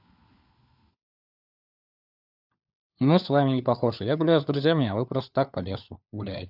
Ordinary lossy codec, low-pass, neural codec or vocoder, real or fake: AAC, 48 kbps; 5.4 kHz; codec, 16 kHz, 4 kbps, FunCodec, trained on LibriTTS, 50 frames a second; fake